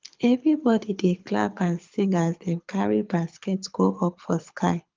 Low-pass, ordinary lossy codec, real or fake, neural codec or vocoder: 7.2 kHz; Opus, 24 kbps; fake; codec, 24 kHz, 6 kbps, HILCodec